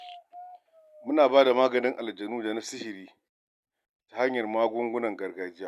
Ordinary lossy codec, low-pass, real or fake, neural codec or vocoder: none; 14.4 kHz; real; none